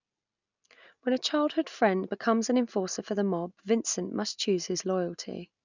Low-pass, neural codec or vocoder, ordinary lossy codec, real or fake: 7.2 kHz; none; none; real